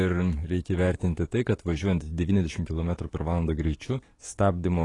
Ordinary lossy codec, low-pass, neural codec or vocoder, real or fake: AAC, 32 kbps; 10.8 kHz; codec, 44.1 kHz, 7.8 kbps, DAC; fake